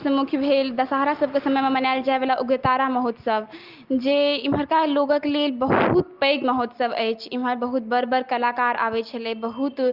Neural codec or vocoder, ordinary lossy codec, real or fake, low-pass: none; Opus, 32 kbps; real; 5.4 kHz